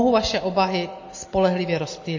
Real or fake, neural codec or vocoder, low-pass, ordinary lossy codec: real; none; 7.2 kHz; MP3, 32 kbps